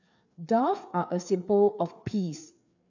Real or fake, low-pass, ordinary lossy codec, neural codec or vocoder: fake; 7.2 kHz; none; codec, 16 kHz, 4 kbps, FreqCodec, larger model